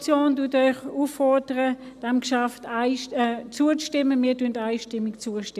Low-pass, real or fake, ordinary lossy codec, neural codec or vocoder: 14.4 kHz; real; none; none